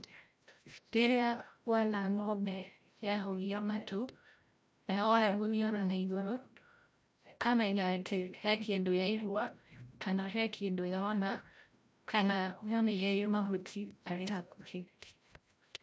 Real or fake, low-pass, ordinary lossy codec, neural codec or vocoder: fake; none; none; codec, 16 kHz, 0.5 kbps, FreqCodec, larger model